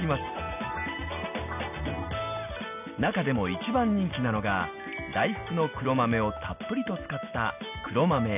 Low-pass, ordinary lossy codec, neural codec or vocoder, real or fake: 3.6 kHz; none; none; real